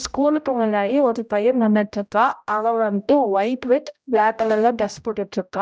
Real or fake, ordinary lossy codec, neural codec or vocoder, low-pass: fake; none; codec, 16 kHz, 0.5 kbps, X-Codec, HuBERT features, trained on general audio; none